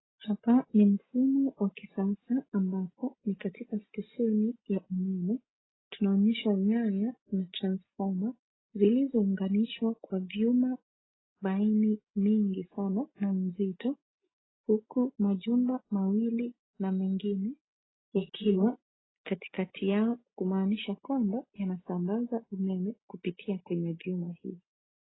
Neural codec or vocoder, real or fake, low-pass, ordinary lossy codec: none; real; 7.2 kHz; AAC, 16 kbps